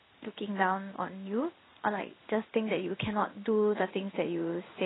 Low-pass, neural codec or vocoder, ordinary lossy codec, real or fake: 7.2 kHz; codec, 16 kHz in and 24 kHz out, 1 kbps, XY-Tokenizer; AAC, 16 kbps; fake